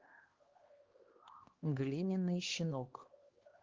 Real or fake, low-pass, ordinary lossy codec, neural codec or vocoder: fake; 7.2 kHz; Opus, 16 kbps; codec, 16 kHz, 2 kbps, X-Codec, HuBERT features, trained on LibriSpeech